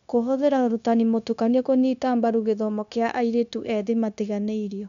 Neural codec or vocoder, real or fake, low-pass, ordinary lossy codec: codec, 16 kHz, 0.9 kbps, LongCat-Audio-Codec; fake; 7.2 kHz; none